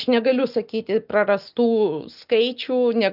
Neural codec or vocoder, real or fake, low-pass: none; real; 5.4 kHz